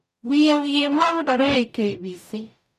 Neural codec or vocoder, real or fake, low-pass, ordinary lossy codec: codec, 44.1 kHz, 0.9 kbps, DAC; fake; 14.4 kHz; none